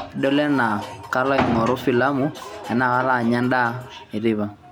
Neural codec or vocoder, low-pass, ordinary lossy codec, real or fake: none; none; none; real